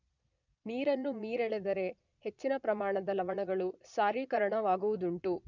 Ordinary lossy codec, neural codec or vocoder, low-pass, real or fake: none; vocoder, 22.05 kHz, 80 mel bands, Vocos; 7.2 kHz; fake